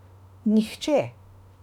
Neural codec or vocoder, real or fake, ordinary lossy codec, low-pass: autoencoder, 48 kHz, 32 numbers a frame, DAC-VAE, trained on Japanese speech; fake; none; 19.8 kHz